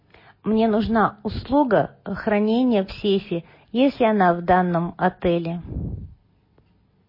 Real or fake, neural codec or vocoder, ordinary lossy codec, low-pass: real; none; MP3, 24 kbps; 5.4 kHz